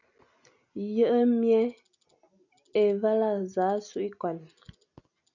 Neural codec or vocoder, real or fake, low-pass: none; real; 7.2 kHz